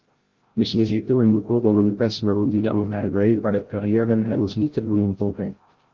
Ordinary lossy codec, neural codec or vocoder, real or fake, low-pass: Opus, 16 kbps; codec, 16 kHz, 0.5 kbps, FreqCodec, larger model; fake; 7.2 kHz